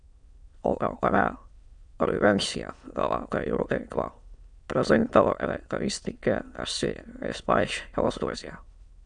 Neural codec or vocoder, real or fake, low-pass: autoencoder, 22.05 kHz, a latent of 192 numbers a frame, VITS, trained on many speakers; fake; 9.9 kHz